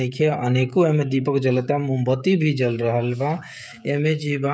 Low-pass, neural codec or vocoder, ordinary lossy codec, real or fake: none; codec, 16 kHz, 16 kbps, FreqCodec, smaller model; none; fake